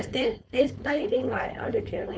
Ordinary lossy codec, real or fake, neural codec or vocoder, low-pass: none; fake; codec, 16 kHz, 4.8 kbps, FACodec; none